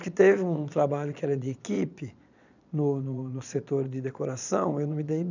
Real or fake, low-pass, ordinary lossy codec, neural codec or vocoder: fake; 7.2 kHz; none; vocoder, 22.05 kHz, 80 mel bands, Vocos